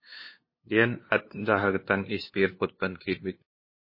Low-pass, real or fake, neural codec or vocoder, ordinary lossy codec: 5.4 kHz; fake; codec, 16 kHz, 2 kbps, FunCodec, trained on LibriTTS, 25 frames a second; MP3, 24 kbps